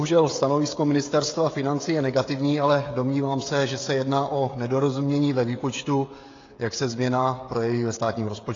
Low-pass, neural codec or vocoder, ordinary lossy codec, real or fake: 7.2 kHz; codec, 16 kHz, 16 kbps, FreqCodec, smaller model; AAC, 32 kbps; fake